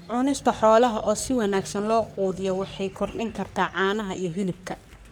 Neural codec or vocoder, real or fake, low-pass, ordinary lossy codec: codec, 44.1 kHz, 3.4 kbps, Pupu-Codec; fake; none; none